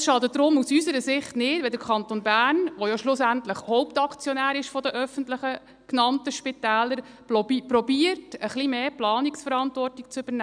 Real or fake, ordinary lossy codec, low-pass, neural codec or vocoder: real; none; 9.9 kHz; none